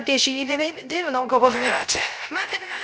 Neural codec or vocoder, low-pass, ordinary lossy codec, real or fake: codec, 16 kHz, 0.3 kbps, FocalCodec; none; none; fake